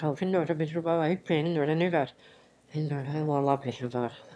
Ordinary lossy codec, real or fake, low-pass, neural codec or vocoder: none; fake; none; autoencoder, 22.05 kHz, a latent of 192 numbers a frame, VITS, trained on one speaker